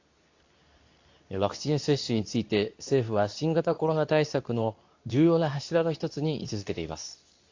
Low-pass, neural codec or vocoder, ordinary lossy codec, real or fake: 7.2 kHz; codec, 24 kHz, 0.9 kbps, WavTokenizer, medium speech release version 2; AAC, 48 kbps; fake